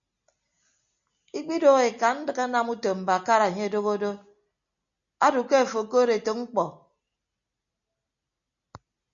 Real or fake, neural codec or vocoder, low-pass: real; none; 7.2 kHz